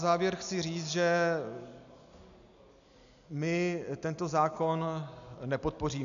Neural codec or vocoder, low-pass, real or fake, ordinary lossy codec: none; 7.2 kHz; real; MP3, 96 kbps